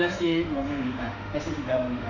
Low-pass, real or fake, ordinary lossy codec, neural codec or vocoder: 7.2 kHz; fake; none; autoencoder, 48 kHz, 32 numbers a frame, DAC-VAE, trained on Japanese speech